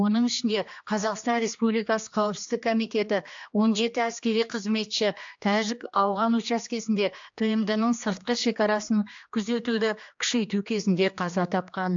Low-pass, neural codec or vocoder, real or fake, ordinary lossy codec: 7.2 kHz; codec, 16 kHz, 2 kbps, X-Codec, HuBERT features, trained on general audio; fake; AAC, 64 kbps